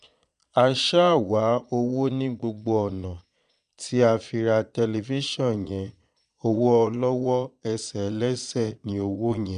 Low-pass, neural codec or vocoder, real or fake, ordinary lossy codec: 9.9 kHz; vocoder, 22.05 kHz, 80 mel bands, Vocos; fake; none